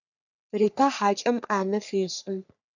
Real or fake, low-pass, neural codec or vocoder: fake; 7.2 kHz; codec, 44.1 kHz, 3.4 kbps, Pupu-Codec